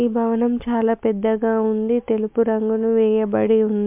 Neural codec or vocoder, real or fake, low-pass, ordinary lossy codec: none; real; 3.6 kHz; MP3, 32 kbps